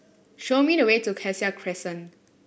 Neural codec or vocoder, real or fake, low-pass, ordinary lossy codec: none; real; none; none